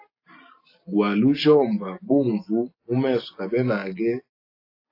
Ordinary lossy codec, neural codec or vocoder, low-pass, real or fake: AAC, 32 kbps; none; 5.4 kHz; real